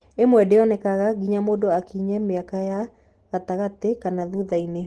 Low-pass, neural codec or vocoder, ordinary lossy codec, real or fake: 10.8 kHz; none; Opus, 16 kbps; real